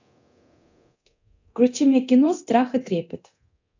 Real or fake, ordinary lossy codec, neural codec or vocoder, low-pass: fake; AAC, 48 kbps; codec, 24 kHz, 0.9 kbps, DualCodec; 7.2 kHz